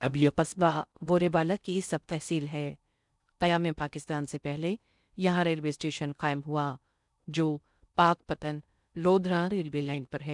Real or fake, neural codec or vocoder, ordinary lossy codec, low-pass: fake; codec, 16 kHz in and 24 kHz out, 0.6 kbps, FocalCodec, streaming, 4096 codes; none; 10.8 kHz